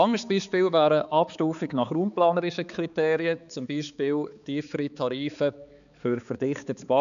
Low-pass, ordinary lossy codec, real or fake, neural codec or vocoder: 7.2 kHz; none; fake; codec, 16 kHz, 4 kbps, X-Codec, HuBERT features, trained on balanced general audio